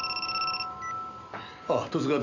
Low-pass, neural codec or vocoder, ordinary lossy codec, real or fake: 7.2 kHz; none; none; real